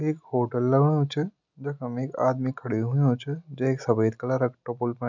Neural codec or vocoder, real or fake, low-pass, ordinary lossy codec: none; real; 7.2 kHz; none